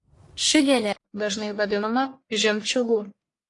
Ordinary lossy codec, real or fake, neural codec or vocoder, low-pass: AAC, 48 kbps; fake; codec, 44.1 kHz, 1.7 kbps, Pupu-Codec; 10.8 kHz